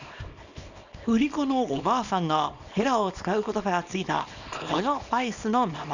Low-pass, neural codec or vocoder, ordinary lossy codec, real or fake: 7.2 kHz; codec, 24 kHz, 0.9 kbps, WavTokenizer, small release; none; fake